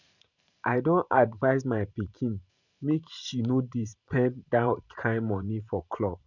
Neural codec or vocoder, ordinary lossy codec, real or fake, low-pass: vocoder, 44.1 kHz, 128 mel bands every 512 samples, BigVGAN v2; none; fake; 7.2 kHz